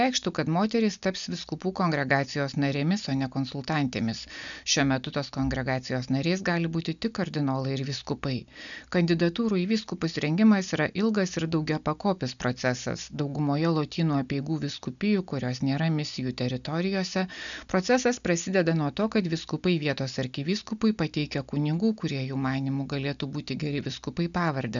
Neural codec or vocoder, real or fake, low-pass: none; real; 7.2 kHz